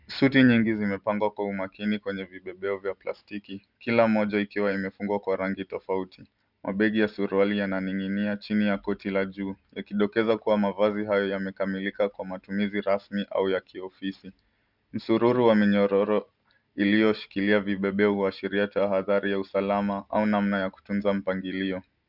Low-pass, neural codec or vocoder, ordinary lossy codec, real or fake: 5.4 kHz; none; Opus, 64 kbps; real